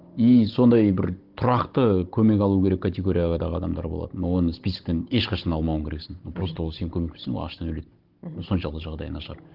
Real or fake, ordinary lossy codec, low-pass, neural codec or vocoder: fake; Opus, 16 kbps; 5.4 kHz; codec, 16 kHz, 16 kbps, FunCodec, trained on Chinese and English, 50 frames a second